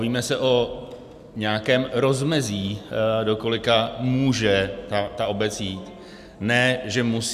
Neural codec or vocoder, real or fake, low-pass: none; real; 14.4 kHz